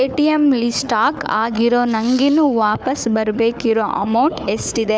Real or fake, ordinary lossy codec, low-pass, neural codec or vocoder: fake; none; none; codec, 16 kHz, 4 kbps, FunCodec, trained on Chinese and English, 50 frames a second